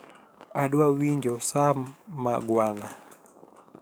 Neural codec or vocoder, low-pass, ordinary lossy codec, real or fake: codec, 44.1 kHz, 7.8 kbps, DAC; none; none; fake